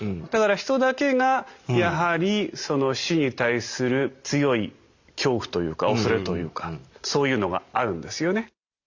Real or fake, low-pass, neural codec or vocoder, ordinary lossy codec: real; 7.2 kHz; none; Opus, 64 kbps